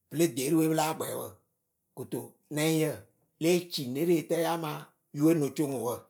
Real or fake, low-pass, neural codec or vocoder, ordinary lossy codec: fake; none; vocoder, 44.1 kHz, 128 mel bands every 512 samples, BigVGAN v2; none